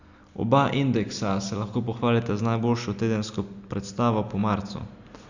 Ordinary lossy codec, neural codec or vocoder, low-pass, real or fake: Opus, 64 kbps; none; 7.2 kHz; real